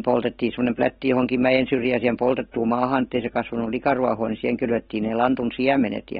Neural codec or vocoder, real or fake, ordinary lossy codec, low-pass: none; real; AAC, 16 kbps; 19.8 kHz